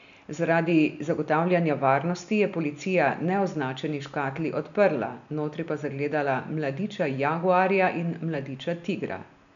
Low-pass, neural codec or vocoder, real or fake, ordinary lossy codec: 7.2 kHz; none; real; none